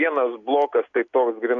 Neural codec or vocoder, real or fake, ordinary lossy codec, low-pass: none; real; AAC, 48 kbps; 7.2 kHz